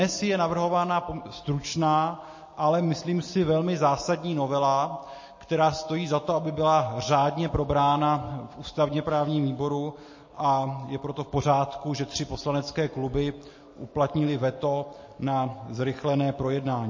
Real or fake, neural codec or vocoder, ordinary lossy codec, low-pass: real; none; MP3, 32 kbps; 7.2 kHz